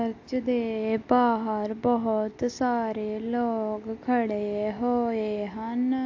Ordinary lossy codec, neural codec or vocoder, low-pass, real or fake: AAC, 48 kbps; none; 7.2 kHz; real